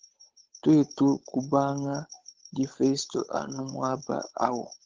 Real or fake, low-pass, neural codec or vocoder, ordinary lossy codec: fake; 7.2 kHz; codec, 16 kHz, 8 kbps, FunCodec, trained on Chinese and English, 25 frames a second; Opus, 16 kbps